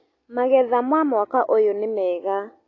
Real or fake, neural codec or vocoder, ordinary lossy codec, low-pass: real; none; AAC, 32 kbps; 7.2 kHz